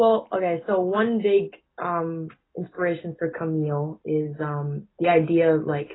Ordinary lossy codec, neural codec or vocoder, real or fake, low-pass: AAC, 16 kbps; none; real; 7.2 kHz